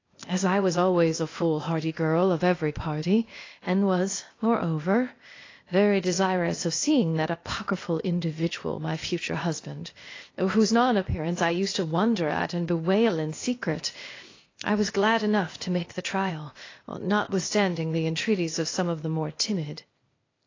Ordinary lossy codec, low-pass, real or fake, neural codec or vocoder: AAC, 32 kbps; 7.2 kHz; fake; codec, 16 kHz, 0.8 kbps, ZipCodec